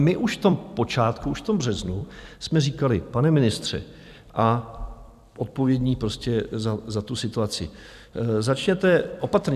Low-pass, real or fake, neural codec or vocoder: 14.4 kHz; fake; vocoder, 44.1 kHz, 128 mel bands every 256 samples, BigVGAN v2